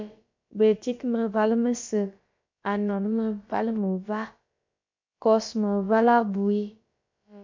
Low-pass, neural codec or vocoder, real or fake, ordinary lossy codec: 7.2 kHz; codec, 16 kHz, about 1 kbps, DyCAST, with the encoder's durations; fake; MP3, 64 kbps